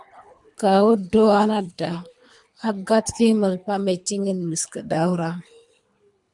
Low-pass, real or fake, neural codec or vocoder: 10.8 kHz; fake; codec, 24 kHz, 3 kbps, HILCodec